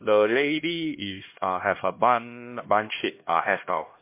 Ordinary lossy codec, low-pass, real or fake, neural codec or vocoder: MP3, 24 kbps; 3.6 kHz; fake; codec, 16 kHz, 1 kbps, X-Codec, HuBERT features, trained on LibriSpeech